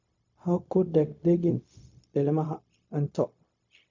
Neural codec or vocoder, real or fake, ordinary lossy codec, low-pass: codec, 16 kHz, 0.4 kbps, LongCat-Audio-Codec; fake; MP3, 48 kbps; 7.2 kHz